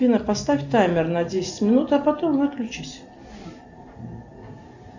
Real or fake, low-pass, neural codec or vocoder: real; 7.2 kHz; none